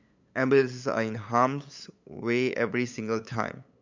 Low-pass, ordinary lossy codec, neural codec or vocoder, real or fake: 7.2 kHz; MP3, 64 kbps; codec, 16 kHz, 8 kbps, FunCodec, trained on LibriTTS, 25 frames a second; fake